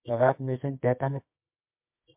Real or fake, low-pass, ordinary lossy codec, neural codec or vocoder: fake; 3.6 kHz; MP3, 32 kbps; codec, 24 kHz, 0.9 kbps, WavTokenizer, medium music audio release